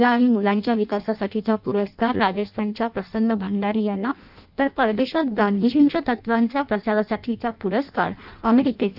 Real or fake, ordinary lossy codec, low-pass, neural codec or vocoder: fake; none; 5.4 kHz; codec, 16 kHz in and 24 kHz out, 0.6 kbps, FireRedTTS-2 codec